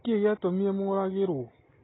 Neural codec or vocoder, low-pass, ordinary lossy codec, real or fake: none; 7.2 kHz; AAC, 16 kbps; real